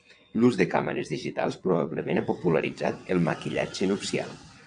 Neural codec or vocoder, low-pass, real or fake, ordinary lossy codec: vocoder, 22.05 kHz, 80 mel bands, WaveNeXt; 9.9 kHz; fake; MP3, 64 kbps